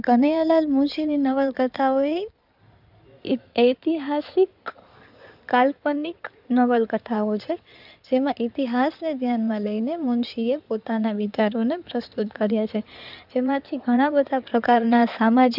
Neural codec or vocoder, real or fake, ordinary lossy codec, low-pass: codec, 16 kHz in and 24 kHz out, 2.2 kbps, FireRedTTS-2 codec; fake; none; 5.4 kHz